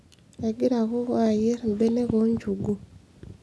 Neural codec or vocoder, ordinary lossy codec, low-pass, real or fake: none; none; none; real